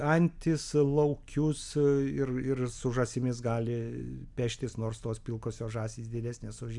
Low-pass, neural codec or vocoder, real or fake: 10.8 kHz; none; real